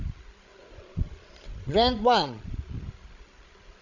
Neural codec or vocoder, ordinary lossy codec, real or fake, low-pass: codec, 16 kHz, 16 kbps, FreqCodec, larger model; none; fake; 7.2 kHz